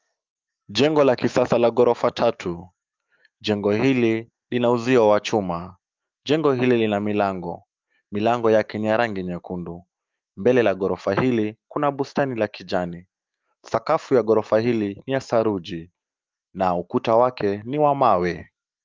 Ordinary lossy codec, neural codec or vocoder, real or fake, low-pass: Opus, 24 kbps; autoencoder, 48 kHz, 128 numbers a frame, DAC-VAE, trained on Japanese speech; fake; 7.2 kHz